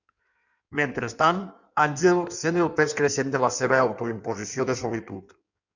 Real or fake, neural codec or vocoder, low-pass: fake; codec, 16 kHz in and 24 kHz out, 1.1 kbps, FireRedTTS-2 codec; 7.2 kHz